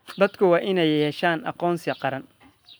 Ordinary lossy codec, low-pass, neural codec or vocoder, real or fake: none; none; none; real